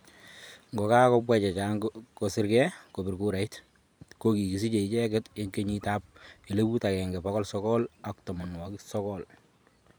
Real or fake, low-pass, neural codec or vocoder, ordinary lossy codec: fake; none; vocoder, 44.1 kHz, 128 mel bands every 512 samples, BigVGAN v2; none